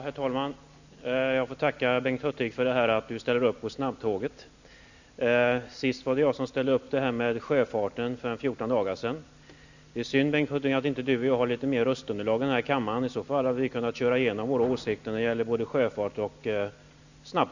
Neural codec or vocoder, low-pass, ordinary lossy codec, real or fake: none; 7.2 kHz; none; real